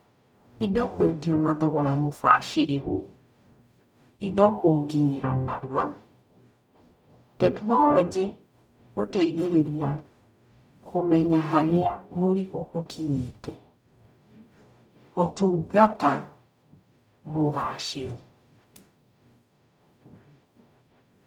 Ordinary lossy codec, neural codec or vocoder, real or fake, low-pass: none; codec, 44.1 kHz, 0.9 kbps, DAC; fake; 19.8 kHz